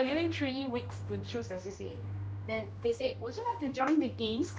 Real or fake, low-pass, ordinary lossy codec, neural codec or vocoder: fake; none; none; codec, 16 kHz, 1 kbps, X-Codec, HuBERT features, trained on general audio